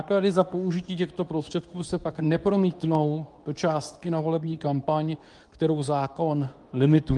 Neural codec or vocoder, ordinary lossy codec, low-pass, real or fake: codec, 24 kHz, 0.9 kbps, WavTokenizer, medium speech release version 2; Opus, 32 kbps; 10.8 kHz; fake